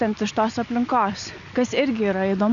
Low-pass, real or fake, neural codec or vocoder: 7.2 kHz; real; none